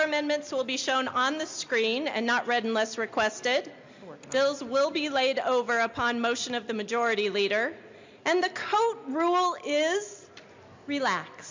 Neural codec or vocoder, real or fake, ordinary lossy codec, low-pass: none; real; MP3, 64 kbps; 7.2 kHz